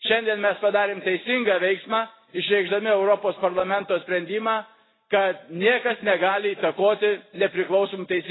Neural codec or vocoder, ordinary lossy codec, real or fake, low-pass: none; AAC, 16 kbps; real; 7.2 kHz